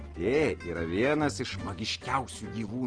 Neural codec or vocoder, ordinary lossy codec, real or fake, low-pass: none; Opus, 16 kbps; real; 9.9 kHz